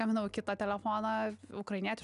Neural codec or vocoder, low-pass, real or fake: none; 10.8 kHz; real